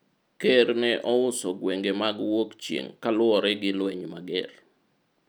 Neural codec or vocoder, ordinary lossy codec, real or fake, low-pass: none; none; real; none